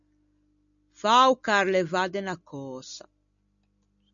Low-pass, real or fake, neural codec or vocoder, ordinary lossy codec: 7.2 kHz; real; none; MP3, 96 kbps